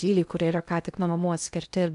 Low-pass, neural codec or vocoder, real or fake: 10.8 kHz; codec, 16 kHz in and 24 kHz out, 0.6 kbps, FocalCodec, streaming, 2048 codes; fake